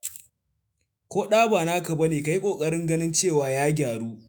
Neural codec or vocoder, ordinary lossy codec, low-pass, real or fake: autoencoder, 48 kHz, 128 numbers a frame, DAC-VAE, trained on Japanese speech; none; none; fake